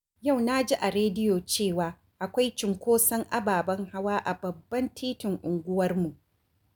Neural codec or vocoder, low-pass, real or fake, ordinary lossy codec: none; none; real; none